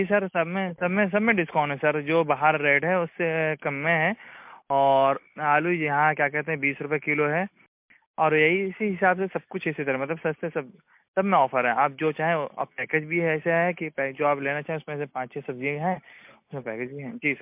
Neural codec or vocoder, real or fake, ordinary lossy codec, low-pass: none; real; MP3, 32 kbps; 3.6 kHz